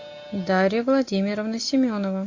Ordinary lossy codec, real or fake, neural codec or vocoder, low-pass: AAC, 32 kbps; real; none; 7.2 kHz